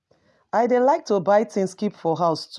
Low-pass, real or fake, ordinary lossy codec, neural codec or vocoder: none; real; none; none